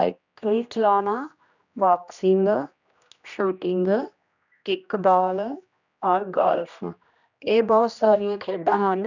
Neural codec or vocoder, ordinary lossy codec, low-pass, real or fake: codec, 16 kHz, 1 kbps, X-Codec, HuBERT features, trained on general audio; none; 7.2 kHz; fake